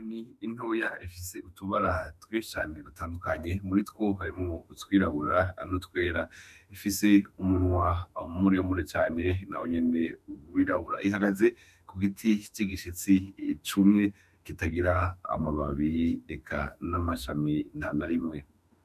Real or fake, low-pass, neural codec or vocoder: fake; 14.4 kHz; autoencoder, 48 kHz, 32 numbers a frame, DAC-VAE, trained on Japanese speech